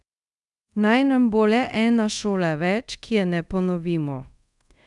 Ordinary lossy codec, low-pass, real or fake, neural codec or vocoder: none; 10.8 kHz; fake; codec, 24 kHz, 0.5 kbps, DualCodec